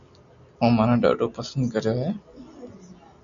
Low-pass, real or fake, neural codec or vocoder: 7.2 kHz; real; none